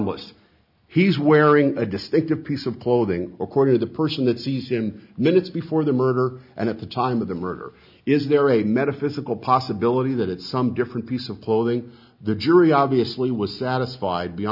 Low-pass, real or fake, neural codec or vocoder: 5.4 kHz; real; none